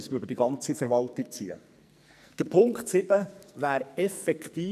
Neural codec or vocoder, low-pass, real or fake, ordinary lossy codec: codec, 44.1 kHz, 2.6 kbps, SNAC; 14.4 kHz; fake; none